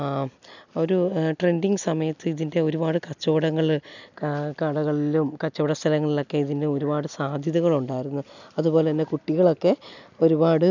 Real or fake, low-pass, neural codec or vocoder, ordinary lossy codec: real; 7.2 kHz; none; none